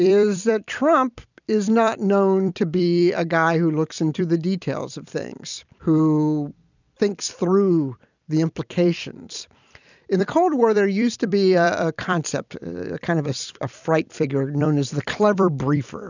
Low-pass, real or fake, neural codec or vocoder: 7.2 kHz; fake; vocoder, 44.1 kHz, 128 mel bands every 256 samples, BigVGAN v2